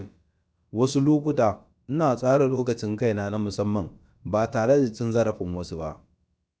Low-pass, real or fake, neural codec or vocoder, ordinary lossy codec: none; fake; codec, 16 kHz, about 1 kbps, DyCAST, with the encoder's durations; none